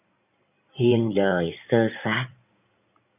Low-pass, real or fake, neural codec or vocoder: 3.6 kHz; fake; codec, 16 kHz in and 24 kHz out, 2.2 kbps, FireRedTTS-2 codec